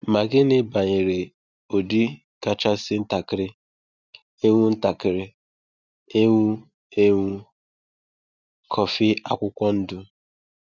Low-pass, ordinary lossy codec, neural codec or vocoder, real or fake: 7.2 kHz; none; none; real